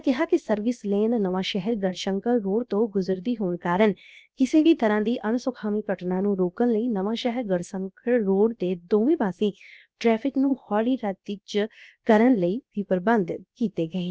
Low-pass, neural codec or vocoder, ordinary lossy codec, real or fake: none; codec, 16 kHz, 0.7 kbps, FocalCodec; none; fake